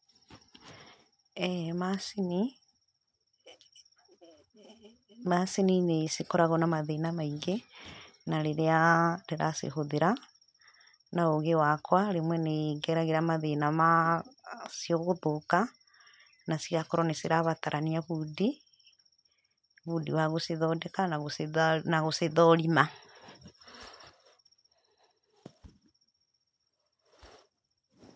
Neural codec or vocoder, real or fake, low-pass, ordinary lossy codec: none; real; none; none